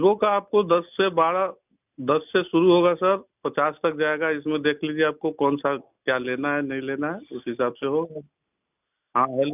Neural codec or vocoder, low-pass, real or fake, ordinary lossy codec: none; 3.6 kHz; real; none